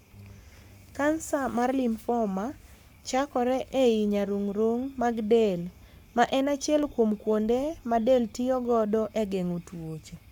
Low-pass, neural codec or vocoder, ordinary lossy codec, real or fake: none; codec, 44.1 kHz, 7.8 kbps, Pupu-Codec; none; fake